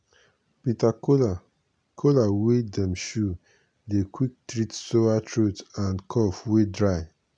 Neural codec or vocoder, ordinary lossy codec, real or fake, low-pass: none; none; real; 9.9 kHz